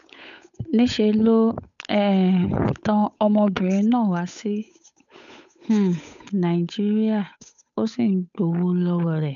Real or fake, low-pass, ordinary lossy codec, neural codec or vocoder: fake; 7.2 kHz; MP3, 96 kbps; codec, 16 kHz, 8 kbps, FunCodec, trained on Chinese and English, 25 frames a second